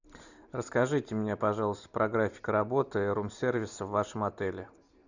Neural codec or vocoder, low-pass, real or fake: none; 7.2 kHz; real